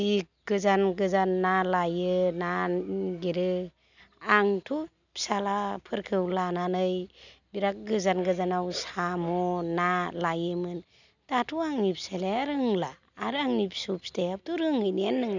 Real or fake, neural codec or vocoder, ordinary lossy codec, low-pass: real; none; none; 7.2 kHz